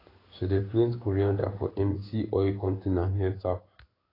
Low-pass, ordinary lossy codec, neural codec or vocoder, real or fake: 5.4 kHz; AAC, 24 kbps; codec, 16 kHz in and 24 kHz out, 1 kbps, XY-Tokenizer; fake